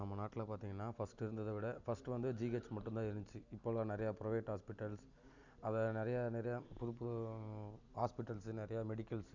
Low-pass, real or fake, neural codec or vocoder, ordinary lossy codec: 7.2 kHz; real; none; none